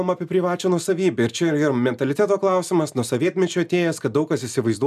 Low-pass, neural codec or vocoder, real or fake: 14.4 kHz; none; real